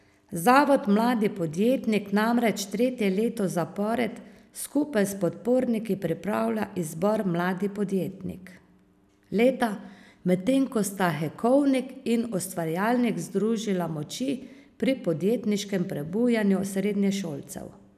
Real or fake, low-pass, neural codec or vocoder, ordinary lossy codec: real; 14.4 kHz; none; none